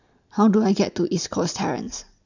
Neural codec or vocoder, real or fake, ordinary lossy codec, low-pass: none; real; none; 7.2 kHz